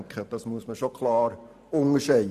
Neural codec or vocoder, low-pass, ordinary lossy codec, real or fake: vocoder, 44.1 kHz, 128 mel bands every 512 samples, BigVGAN v2; 14.4 kHz; none; fake